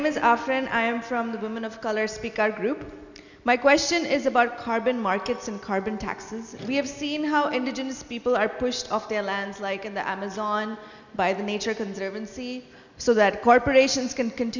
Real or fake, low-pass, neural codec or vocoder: real; 7.2 kHz; none